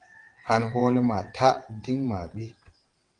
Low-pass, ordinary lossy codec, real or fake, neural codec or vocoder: 9.9 kHz; Opus, 24 kbps; fake; vocoder, 22.05 kHz, 80 mel bands, WaveNeXt